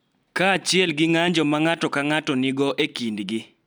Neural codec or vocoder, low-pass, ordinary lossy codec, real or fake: none; 19.8 kHz; none; real